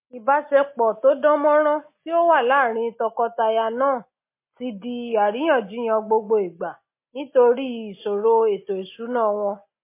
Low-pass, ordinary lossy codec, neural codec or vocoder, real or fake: 3.6 kHz; MP3, 24 kbps; none; real